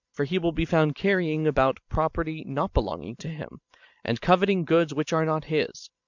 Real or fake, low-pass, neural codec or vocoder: fake; 7.2 kHz; vocoder, 44.1 kHz, 128 mel bands every 512 samples, BigVGAN v2